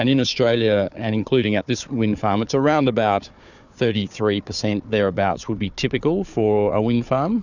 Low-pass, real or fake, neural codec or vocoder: 7.2 kHz; fake; codec, 16 kHz, 4 kbps, FunCodec, trained on Chinese and English, 50 frames a second